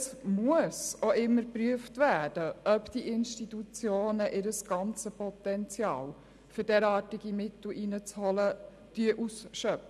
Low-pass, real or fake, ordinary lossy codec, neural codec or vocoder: none; real; none; none